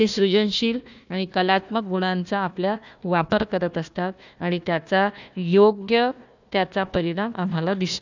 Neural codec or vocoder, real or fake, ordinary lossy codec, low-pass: codec, 16 kHz, 1 kbps, FunCodec, trained on Chinese and English, 50 frames a second; fake; none; 7.2 kHz